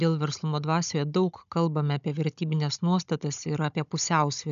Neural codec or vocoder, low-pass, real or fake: codec, 16 kHz, 16 kbps, FunCodec, trained on Chinese and English, 50 frames a second; 7.2 kHz; fake